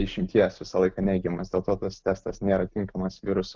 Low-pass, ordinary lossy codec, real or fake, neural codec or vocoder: 7.2 kHz; Opus, 32 kbps; real; none